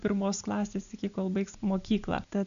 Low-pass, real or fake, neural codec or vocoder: 7.2 kHz; real; none